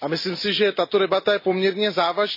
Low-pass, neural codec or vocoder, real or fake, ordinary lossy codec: 5.4 kHz; none; real; MP3, 24 kbps